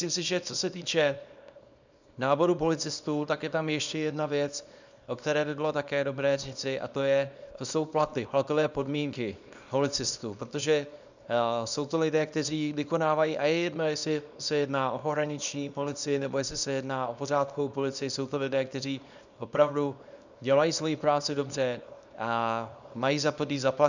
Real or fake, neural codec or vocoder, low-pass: fake; codec, 24 kHz, 0.9 kbps, WavTokenizer, small release; 7.2 kHz